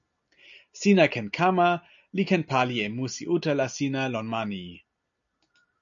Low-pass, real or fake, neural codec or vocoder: 7.2 kHz; real; none